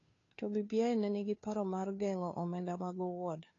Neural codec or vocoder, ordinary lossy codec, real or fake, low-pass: codec, 16 kHz, 2 kbps, FunCodec, trained on Chinese and English, 25 frames a second; AAC, 32 kbps; fake; 7.2 kHz